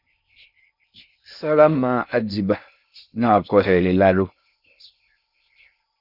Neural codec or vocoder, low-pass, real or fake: codec, 16 kHz in and 24 kHz out, 0.6 kbps, FocalCodec, streaming, 2048 codes; 5.4 kHz; fake